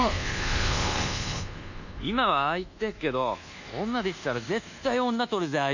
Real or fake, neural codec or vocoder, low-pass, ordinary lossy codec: fake; codec, 24 kHz, 1.2 kbps, DualCodec; 7.2 kHz; none